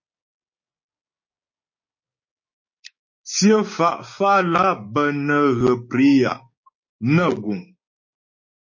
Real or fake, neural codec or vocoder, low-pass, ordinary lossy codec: fake; codec, 16 kHz, 6 kbps, DAC; 7.2 kHz; MP3, 32 kbps